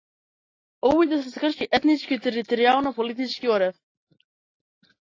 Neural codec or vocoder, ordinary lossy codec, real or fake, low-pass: none; AAC, 32 kbps; real; 7.2 kHz